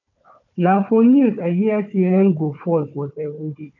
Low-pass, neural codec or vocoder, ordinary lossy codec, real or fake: 7.2 kHz; codec, 16 kHz, 4 kbps, FunCodec, trained on Chinese and English, 50 frames a second; none; fake